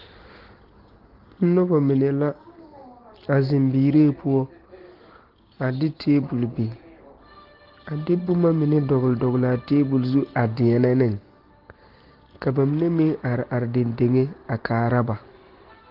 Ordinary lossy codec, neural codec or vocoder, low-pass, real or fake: Opus, 16 kbps; none; 5.4 kHz; real